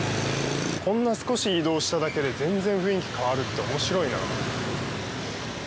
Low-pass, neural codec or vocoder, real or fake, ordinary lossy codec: none; none; real; none